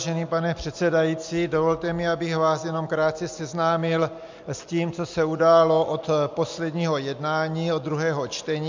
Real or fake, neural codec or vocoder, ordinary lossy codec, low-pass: real; none; MP3, 64 kbps; 7.2 kHz